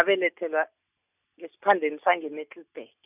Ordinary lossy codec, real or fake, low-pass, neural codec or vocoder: none; real; 3.6 kHz; none